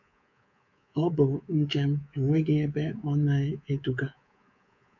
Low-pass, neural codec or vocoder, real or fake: 7.2 kHz; codec, 24 kHz, 3.1 kbps, DualCodec; fake